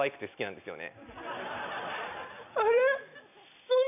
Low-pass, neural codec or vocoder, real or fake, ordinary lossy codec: 3.6 kHz; none; real; none